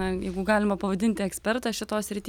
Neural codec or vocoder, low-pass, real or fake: none; 19.8 kHz; real